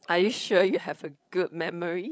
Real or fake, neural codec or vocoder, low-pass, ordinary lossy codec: fake; codec, 16 kHz, 16 kbps, FreqCodec, larger model; none; none